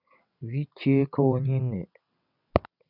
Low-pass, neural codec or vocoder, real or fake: 5.4 kHz; vocoder, 22.05 kHz, 80 mel bands, WaveNeXt; fake